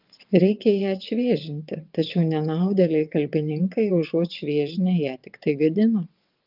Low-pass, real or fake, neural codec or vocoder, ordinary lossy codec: 5.4 kHz; fake; vocoder, 22.05 kHz, 80 mel bands, WaveNeXt; Opus, 24 kbps